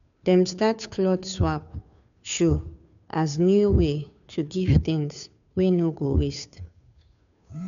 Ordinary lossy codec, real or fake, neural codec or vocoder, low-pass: none; fake; codec, 16 kHz, 2 kbps, FunCodec, trained on Chinese and English, 25 frames a second; 7.2 kHz